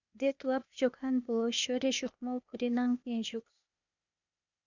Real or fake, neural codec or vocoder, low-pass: fake; codec, 16 kHz, 0.8 kbps, ZipCodec; 7.2 kHz